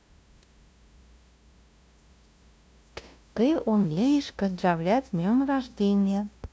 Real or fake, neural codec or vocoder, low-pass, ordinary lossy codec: fake; codec, 16 kHz, 0.5 kbps, FunCodec, trained on LibriTTS, 25 frames a second; none; none